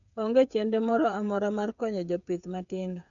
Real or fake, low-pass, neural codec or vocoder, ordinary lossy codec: fake; 7.2 kHz; codec, 16 kHz, 8 kbps, FreqCodec, smaller model; none